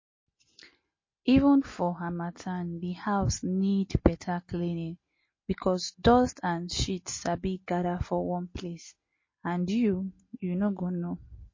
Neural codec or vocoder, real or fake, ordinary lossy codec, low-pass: none; real; MP3, 32 kbps; 7.2 kHz